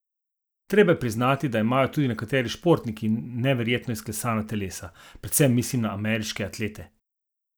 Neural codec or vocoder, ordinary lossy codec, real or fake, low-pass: none; none; real; none